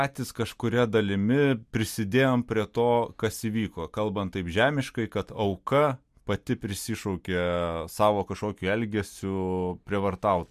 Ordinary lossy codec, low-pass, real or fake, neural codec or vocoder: MP3, 96 kbps; 14.4 kHz; real; none